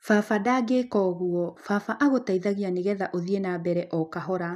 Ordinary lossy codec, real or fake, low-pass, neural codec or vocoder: none; real; 14.4 kHz; none